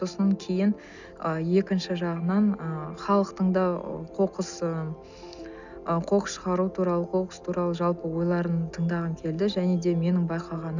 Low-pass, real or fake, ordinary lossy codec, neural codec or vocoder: 7.2 kHz; real; none; none